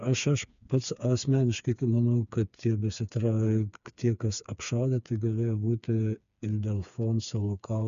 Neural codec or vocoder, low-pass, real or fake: codec, 16 kHz, 4 kbps, FreqCodec, smaller model; 7.2 kHz; fake